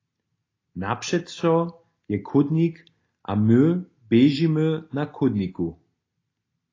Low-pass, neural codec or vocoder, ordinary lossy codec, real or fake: 7.2 kHz; none; AAC, 32 kbps; real